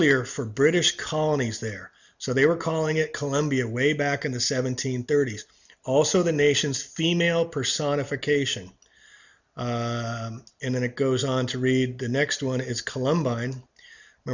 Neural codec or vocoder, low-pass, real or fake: none; 7.2 kHz; real